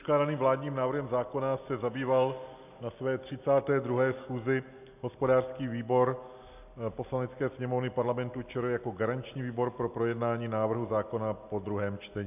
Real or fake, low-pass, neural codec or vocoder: real; 3.6 kHz; none